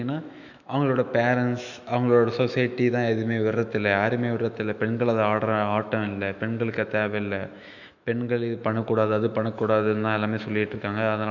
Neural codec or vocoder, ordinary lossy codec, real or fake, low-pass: autoencoder, 48 kHz, 128 numbers a frame, DAC-VAE, trained on Japanese speech; none; fake; 7.2 kHz